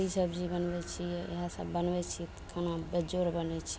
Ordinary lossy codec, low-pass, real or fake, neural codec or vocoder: none; none; real; none